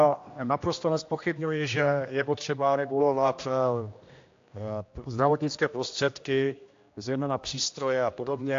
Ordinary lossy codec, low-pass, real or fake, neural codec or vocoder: AAC, 48 kbps; 7.2 kHz; fake; codec, 16 kHz, 1 kbps, X-Codec, HuBERT features, trained on general audio